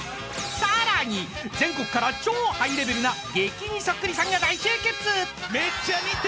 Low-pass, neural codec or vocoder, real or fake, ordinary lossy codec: none; none; real; none